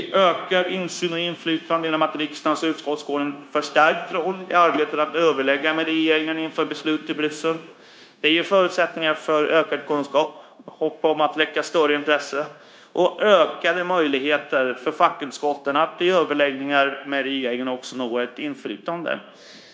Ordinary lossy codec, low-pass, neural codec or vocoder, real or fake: none; none; codec, 16 kHz, 0.9 kbps, LongCat-Audio-Codec; fake